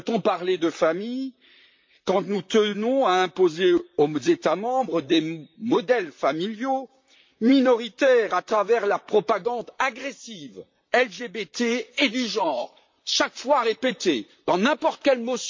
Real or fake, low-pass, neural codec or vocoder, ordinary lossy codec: fake; 7.2 kHz; vocoder, 44.1 kHz, 128 mel bands, Pupu-Vocoder; MP3, 48 kbps